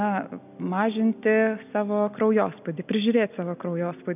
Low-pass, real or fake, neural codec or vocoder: 3.6 kHz; real; none